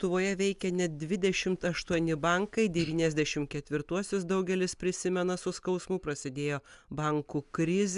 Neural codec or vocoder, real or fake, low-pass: none; real; 10.8 kHz